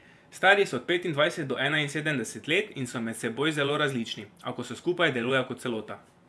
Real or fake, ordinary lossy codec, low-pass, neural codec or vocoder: fake; none; none; vocoder, 24 kHz, 100 mel bands, Vocos